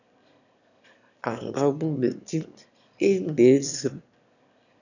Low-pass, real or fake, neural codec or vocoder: 7.2 kHz; fake; autoencoder, 22.05 kHz, a latent of 192 numbers a frame, VITS, trained on one speaker